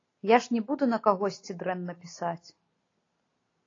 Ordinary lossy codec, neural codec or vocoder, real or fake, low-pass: AAC, 32 kbps; none; real; 7.2 kHz